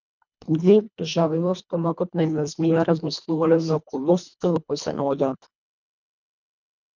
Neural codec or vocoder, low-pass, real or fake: codec, 24 kHz, 1.5 kbps, HILCodec; 7.2 kHz; fake